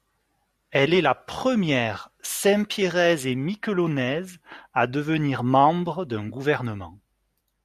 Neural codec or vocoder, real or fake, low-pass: none; real; 14.4 kHz